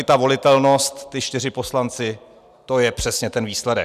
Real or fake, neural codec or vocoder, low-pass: real; none; 14.4 kHz